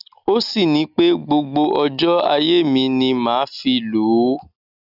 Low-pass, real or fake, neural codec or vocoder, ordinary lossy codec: 5.4 kHz; real; none; none